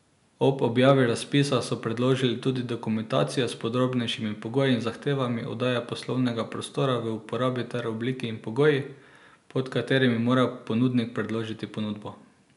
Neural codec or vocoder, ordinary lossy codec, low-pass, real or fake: none; none; 10.8 kHz; real